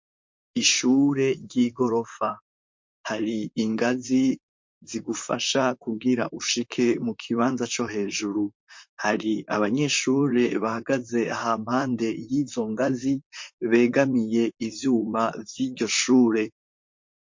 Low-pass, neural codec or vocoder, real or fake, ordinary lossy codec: 7.2 kHz; vocoder, 44.1 kHz, 128 mel bands, Pupu-Vocoder; fake; MP3, 48 kbps